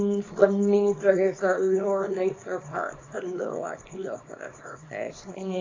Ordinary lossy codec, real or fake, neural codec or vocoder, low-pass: AAC, 32 kbps; fake; codec, 24 kHz, 0.9 kbps, WavTokenizer, small release; 7.2 kHz